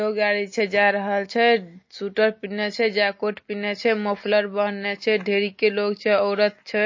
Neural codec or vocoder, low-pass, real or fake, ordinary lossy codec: none; 7.2 kHz; real; MP3, 32 kbps